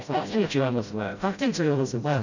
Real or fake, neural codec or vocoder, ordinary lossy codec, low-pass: fake; codec, 16 kHz, 0.5 kbps, FreqCodec, smaller model; none; 7.2 kHz